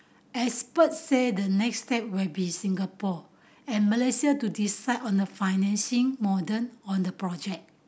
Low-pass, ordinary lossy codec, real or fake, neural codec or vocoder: none; none; real; none